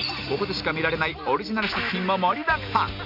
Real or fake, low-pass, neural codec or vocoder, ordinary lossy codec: real; 5.4 kHz; none; none